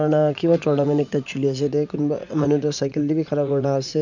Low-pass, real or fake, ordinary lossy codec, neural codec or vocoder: 7.2 kHz; fake; none; vocoder, 44.1 kHz, 80 mel bands, Vocos